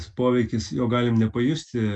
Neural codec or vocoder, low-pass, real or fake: none; 9.9 kHz; real